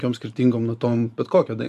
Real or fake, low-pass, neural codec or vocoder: fake; 14.4 kHz; vocoder, 44.1 kHz, 128 mel bands every 512 samples, BigVGAN v2